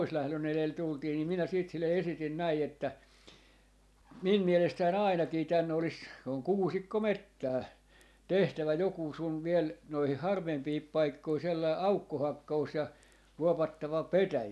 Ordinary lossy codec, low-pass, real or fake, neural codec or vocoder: none; none; real; none